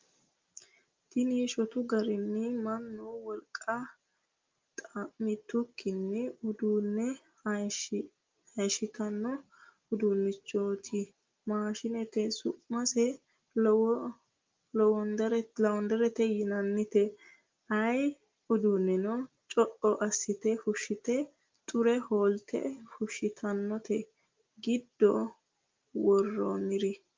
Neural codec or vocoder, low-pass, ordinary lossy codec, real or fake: none; 7.2 kHz; Opus, 24 kbps; real